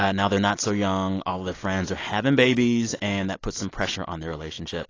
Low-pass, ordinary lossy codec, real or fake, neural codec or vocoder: 7.2 kHz; AAC, 32 kbps; real; none